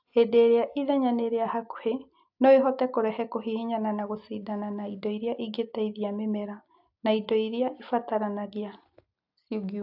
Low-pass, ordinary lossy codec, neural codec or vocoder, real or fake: 5.4 kHz; AAC, 48 kbps; none; real